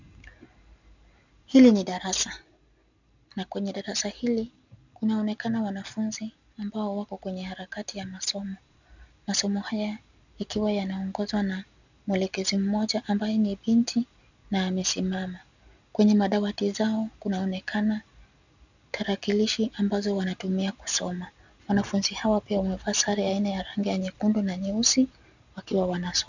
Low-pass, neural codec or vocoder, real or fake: 7.2 kHz; none; real